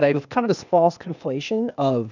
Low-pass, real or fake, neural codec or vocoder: 7.2 kHz; fake; codec, 16 kHz, 0.8 kbps, ZipCodec